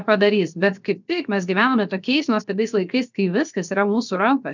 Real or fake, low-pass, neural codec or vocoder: fake; 7.2 kHz; codec, 16 kHz, 0.7 kbps, FocalCodec